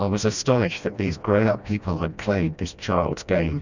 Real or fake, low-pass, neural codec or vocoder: fake; 7.2 kHz; codec, 16 kHz, 1 kbps, FreqCodec, smaller model